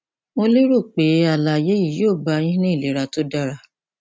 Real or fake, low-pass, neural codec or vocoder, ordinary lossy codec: real; none; none; none